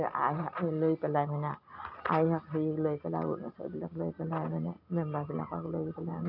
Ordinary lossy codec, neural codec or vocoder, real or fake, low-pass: none; none; real; 5.4 kHz